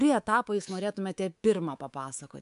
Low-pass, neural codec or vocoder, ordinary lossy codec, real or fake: 10.8 kHz; codec, 24 kHz, 3.1 kbps, DualCodec; AAC, 96 kbps; fake